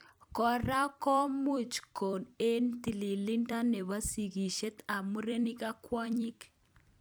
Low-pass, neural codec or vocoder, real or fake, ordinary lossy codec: none; vocoder, 44.1 kHz, 128 mel bands every 256 samples, BigVGAN v2; fake; none